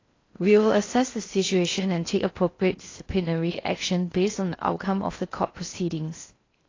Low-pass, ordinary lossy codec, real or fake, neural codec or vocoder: 7.2 kHz; AAC, 32 kbps; fake; codec, 16 kHz in and 24 kHz out, 0.6 kbps, FocalCodec, streaming, 4096 codes